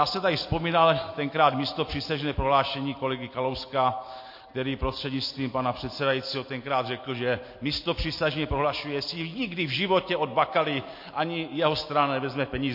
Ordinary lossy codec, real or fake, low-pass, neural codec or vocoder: MP3, 32 kbps; real; 5.4 kHz; none